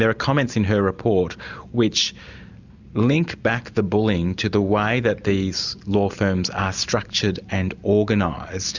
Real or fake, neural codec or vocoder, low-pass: real; none; 7.2 kHz